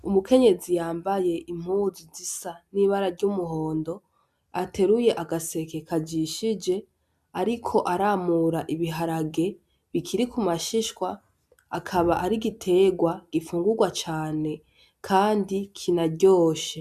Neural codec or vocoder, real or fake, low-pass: none; real; 14.4 kHz